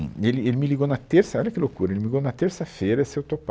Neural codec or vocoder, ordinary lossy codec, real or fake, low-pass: none; none; real; none